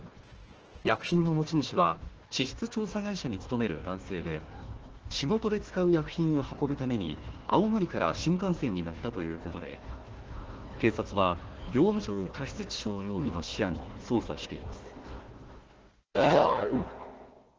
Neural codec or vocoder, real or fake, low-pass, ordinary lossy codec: codec, 16 kHz, 1 kbps, FunCodec, trained on Chinese and English, 50 frames a second; fake; 7.2 kHz; Opus, 16 kbps